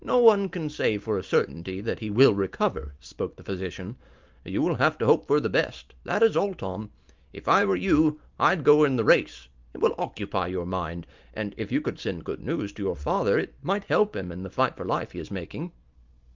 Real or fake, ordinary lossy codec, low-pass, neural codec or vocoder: real; Opus, 24 kbps; 7.2 kHz; none